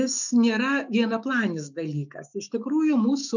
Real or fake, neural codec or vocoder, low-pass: fake; autoencoder, 48 kHz, 128 numbers a frame, DAC-VAE, trained on Japanese speech; 7.2 kHz